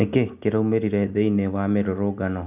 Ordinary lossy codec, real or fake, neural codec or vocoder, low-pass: none; real; none; 3.6 kHz